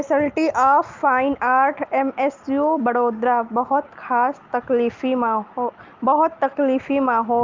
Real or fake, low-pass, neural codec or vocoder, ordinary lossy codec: real; 7.2 kHz; none; Opus, 24 kbps